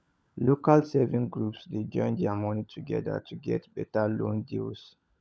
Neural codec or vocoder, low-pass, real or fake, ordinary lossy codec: codec, 16 kHz, 16 kbps, FunCodec, trained on LibriTTS, 50 frames a second; none; fake; none